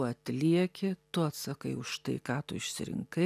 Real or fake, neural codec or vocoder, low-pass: real; none; 14.4 kHz